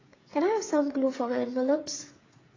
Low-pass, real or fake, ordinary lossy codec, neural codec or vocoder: 7.2 kHz; fake; AAC, 32 kbps; codec, 16 kHz, 8 kbps, FreqCodec, smaller model